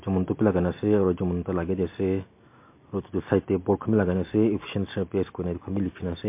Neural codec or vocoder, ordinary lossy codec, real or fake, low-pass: none; MP3, 24 kbps; real; 3.6 kHz